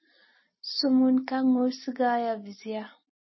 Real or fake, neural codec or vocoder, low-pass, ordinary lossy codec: real; none; 7.2 kHz; MP3, 24 kbps